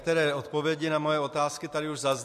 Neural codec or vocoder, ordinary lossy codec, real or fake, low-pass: none; MP3, 64 kbps; real; 14.4 kHz